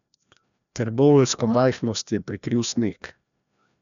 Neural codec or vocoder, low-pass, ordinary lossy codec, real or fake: codec, 16 kHz, 1 kbps, FreqCodec, larger model; 7.2 kHz; none; fake